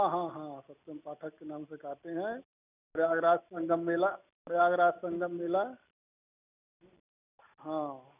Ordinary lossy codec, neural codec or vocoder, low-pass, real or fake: none; none; 3.6 kHz; real